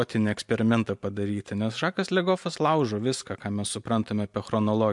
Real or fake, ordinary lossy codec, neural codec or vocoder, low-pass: real; MP3, 64 kbps; none; 10.8 kHz